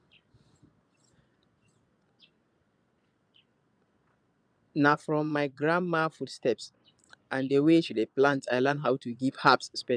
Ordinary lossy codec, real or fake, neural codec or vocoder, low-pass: none; fake; vocoder, 22.05 kHz, 80 mel bands, Vocos; 9.9 kHz